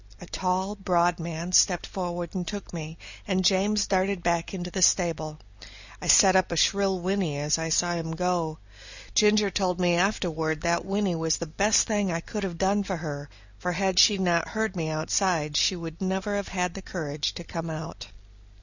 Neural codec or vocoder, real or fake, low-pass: none; real; 7.2 kHz